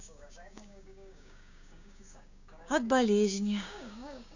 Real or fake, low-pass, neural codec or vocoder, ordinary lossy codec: fake; 7.2 kHz; codec, 16 kHz, 6 kbps, DAC; none